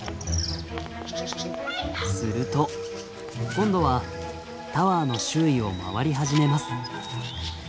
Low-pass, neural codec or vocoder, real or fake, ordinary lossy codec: none; none; real; none